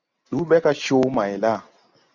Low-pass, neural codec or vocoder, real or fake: 7.2 kHz; none; real